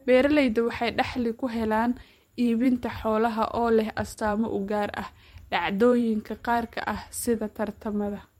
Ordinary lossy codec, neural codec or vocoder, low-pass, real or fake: MP3, 64 kbps; vocoder, 44.1 kHz, 128 mel bands every 512 samples, BigVGAN v2; 19.8 kHz; fake